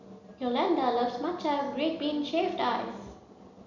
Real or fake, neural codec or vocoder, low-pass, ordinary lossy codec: real; none; 7.2 kHz; none